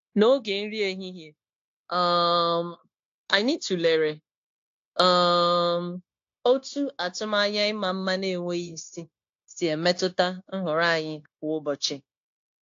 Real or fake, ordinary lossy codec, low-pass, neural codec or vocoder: fake; AAC, 48 kbps; 7.2 kHz; codec, 16 kHz, 0.9 kbps, LongCat-Audio-Codec